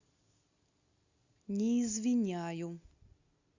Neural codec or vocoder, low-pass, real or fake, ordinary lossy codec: none; 7.2 kHz; real; Opus, 64 kbps